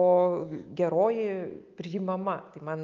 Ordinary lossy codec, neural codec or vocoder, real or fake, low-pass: Opus, 32 kbps; none; real; 7.2 kHz